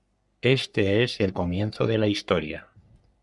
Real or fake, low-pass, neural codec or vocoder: fake; 10.8 kHz; codec, 44.1 kHz, 3.4 kbps, Pupu-Codec